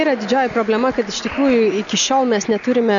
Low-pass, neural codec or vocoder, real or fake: 7.2 kHz; none; real